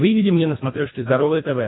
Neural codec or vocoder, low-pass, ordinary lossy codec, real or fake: codec, 24 kHz, 1.5 kbps, HILCodec; 7.2 kHz; AAC, 16 kbps; fake